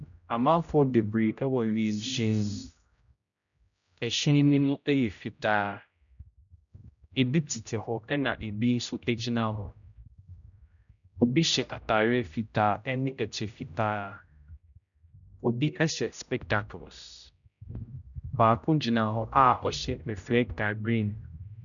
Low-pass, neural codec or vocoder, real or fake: 7.2 kHz; codec, 16 kHz, 0.5 kbps, X-Codec, HuBERT features, trained on general audio; fake